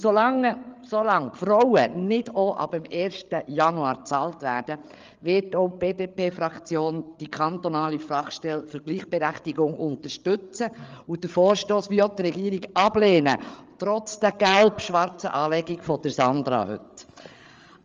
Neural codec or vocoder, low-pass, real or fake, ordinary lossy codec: codec, 16 kHz, 8 kbps, FreqCodec, larger model; 7.2 kHz; fake; Opus, 32 kbps